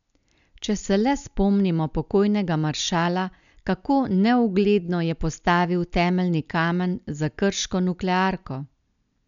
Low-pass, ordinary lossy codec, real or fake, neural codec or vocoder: 7.2 kHz; none; real; none